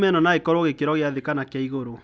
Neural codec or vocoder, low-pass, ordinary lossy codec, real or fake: none; none; none; real